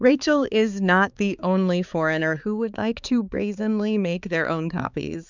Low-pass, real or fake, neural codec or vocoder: 7.2 kHz; fake; codec, 16 kHz, 4 kbps, X-Codec, HuBERT features, trained on balanced general audio